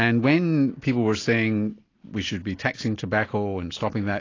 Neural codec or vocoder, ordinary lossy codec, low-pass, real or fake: none; AAC, 32 kbps; 7.2 kHz; real